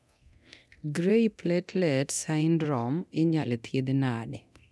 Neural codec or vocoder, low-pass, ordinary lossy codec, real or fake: codec, 24 kHz, 0.9 kbps, DualCodec; none; none; fake